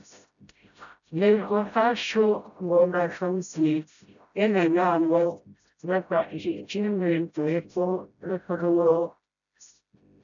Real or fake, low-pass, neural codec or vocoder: fake; 7.2 kHz; codec, 16 kHz, 0.5 kbps, FreqCodec, smaller model